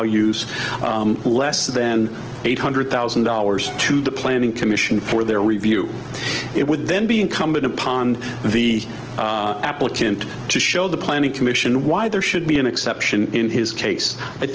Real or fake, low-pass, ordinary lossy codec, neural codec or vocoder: real; 7.2 kHz; Opus, 16 kbps; none